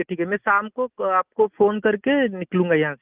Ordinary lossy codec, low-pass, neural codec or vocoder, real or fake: Opus, 24 kbps; 3.6 kHz; none; real